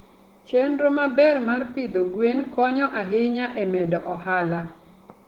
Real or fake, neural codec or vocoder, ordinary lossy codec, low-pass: fake; vocoder, 44.1 kHz, 128 mel bands, Pupu-Vocoder; Opus, 16 kbps; 19.8 kHz